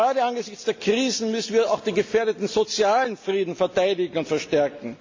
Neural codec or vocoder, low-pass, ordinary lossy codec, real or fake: none; 7.2 kHz; MP3, 48 kbps; real